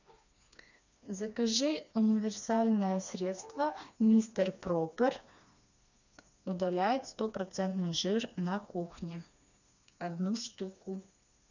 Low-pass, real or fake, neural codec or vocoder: 7.2 kHz; fake; codec, 16 kHz, 2 kbps, FreqCodec, smaller model